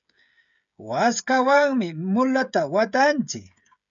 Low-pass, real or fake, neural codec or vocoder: 7.2 kHz; fake; codec, 16 kHz, 16 kbps, FreqCodec, smaller model